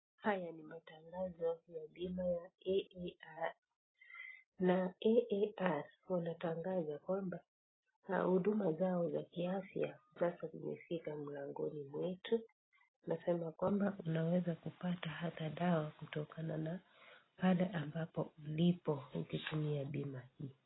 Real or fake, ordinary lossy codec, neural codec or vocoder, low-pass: real; AAC, 16 kbps; none; 7.2 kHz